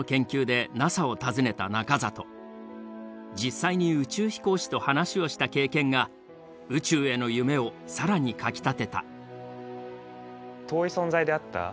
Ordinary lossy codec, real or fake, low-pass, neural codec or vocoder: none; real; none; none